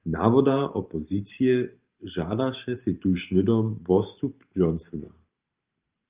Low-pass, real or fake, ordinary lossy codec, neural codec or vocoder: 3.6 kHz; fake; Opus, 24 kbps; vocoder, 44.1 kHz, 128 mel bands every 512 samples, BigVGAN v2